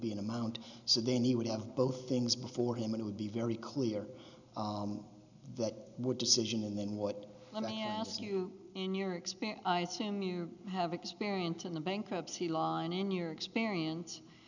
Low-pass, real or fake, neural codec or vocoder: 7.2 kHz; real; none